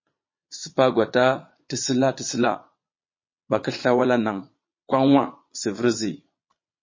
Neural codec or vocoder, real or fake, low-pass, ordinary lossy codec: vocoder, 22.05 kHz, 80 mel bands, Vocos; fake; 7.2 kHz; MP3, 32 kbps